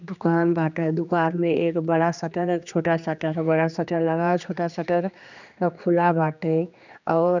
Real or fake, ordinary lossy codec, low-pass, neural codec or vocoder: fake; none; 7.2 kHz; codec, 16 kHz, 2 kbps, X-Codec, HuBERT features, trained on general audio